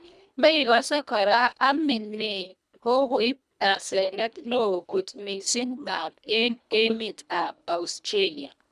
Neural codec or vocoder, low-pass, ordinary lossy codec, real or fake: codec, 24 kHz, 1.5 kbps, HILCodec; none; none; fake